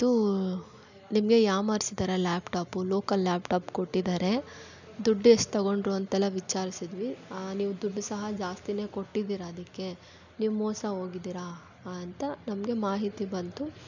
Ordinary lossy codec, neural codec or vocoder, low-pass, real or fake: none; none; 7.2 kHz; real